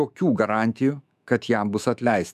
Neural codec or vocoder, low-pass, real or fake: autoencoder, 48 kHz, 128 numbers a frame, DAC-VAE, trained on Japanese speech; 14.4 kHz; fake